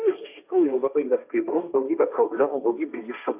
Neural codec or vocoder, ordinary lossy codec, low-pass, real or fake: codec, 16 kHz, 1.1 kbps, Voila-Tokenizer; Opus, 64 kbps; 3.6 kHz; fake